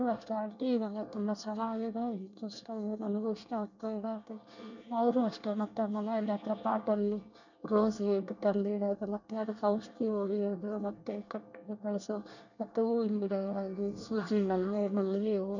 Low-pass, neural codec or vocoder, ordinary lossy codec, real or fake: 7.2 kHz; codec, 24 kHz, 1 kbps, SNAC; none; fake